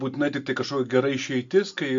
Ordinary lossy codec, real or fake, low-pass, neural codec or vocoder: MP3, 48 kbps; real; 7.2 kHz; none